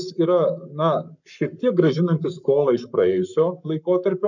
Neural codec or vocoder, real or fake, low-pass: codec, 44.1 kHz, 7.8 kbps, Pupu-Codec; fake; 7.2 kHz